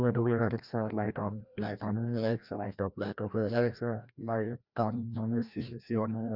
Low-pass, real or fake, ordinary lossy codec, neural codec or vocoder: 5.4 kHz; fake; none; codec, 16 kHz, 1 kbps, FreqCodec, larger model